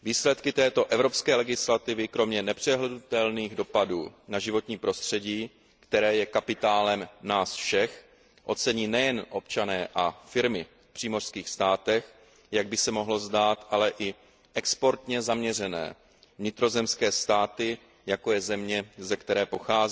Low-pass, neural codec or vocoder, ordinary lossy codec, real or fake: none; none; none; real